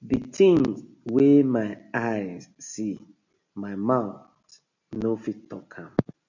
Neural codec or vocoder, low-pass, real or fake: none; 7.2 kHz; real